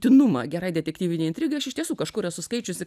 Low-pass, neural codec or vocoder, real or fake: 14.4 kHz; none; real